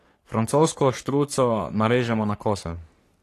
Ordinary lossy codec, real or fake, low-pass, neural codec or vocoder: AAC, 48 kbps; fake; 14.4 kHz; codec, 44.1 kHz, 3.4 kbps, Pupu-Codec